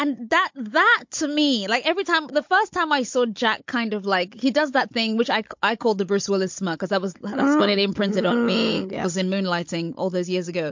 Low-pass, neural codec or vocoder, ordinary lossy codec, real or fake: 7.2 kHz; codec, 16 kHz, 16 kbps, FunCodec, trained on Chinese and English, 50 frames a second; MP3, 48 kbps; fake